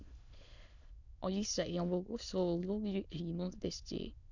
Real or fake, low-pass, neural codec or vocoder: fake; 7.2 kHz; autoencoder, 22.05 kHz, a latent of 192 numbers a frame, VITS, trained on many speakers